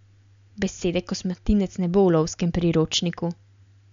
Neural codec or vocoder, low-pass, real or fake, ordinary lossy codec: none; 7.2 kHz; real; MP3, 64 kbps